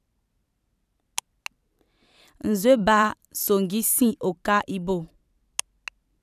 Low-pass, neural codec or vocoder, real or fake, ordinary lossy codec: 14.4 kHz; vocoder, 44.1 kHz, 128 mel bands every 512 samples, BigVGAN v2; fake; none